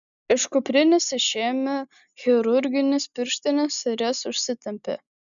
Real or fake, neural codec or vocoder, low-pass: real; none; 7.2 kHz